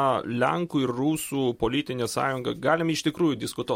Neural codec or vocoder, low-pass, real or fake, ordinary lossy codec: none; 19.8 kHz; real; MP3, 64 kbps